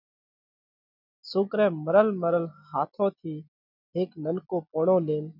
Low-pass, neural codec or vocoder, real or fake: 5.4 kHz; none; real